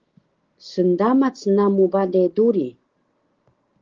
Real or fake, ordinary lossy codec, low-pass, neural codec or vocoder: real; Opus, 16 kbps; 7.2 kHz; none